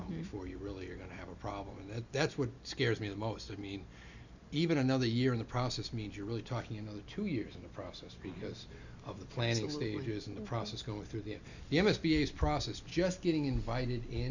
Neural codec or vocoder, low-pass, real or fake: none; 7.2 kHz; real